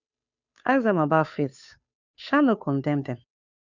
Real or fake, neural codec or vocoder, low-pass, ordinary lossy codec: fake; codec, 16 kHz, 2 kbps, FunCodec, trained on Chinese and English, 25 frames a second; 7.2 kHz; none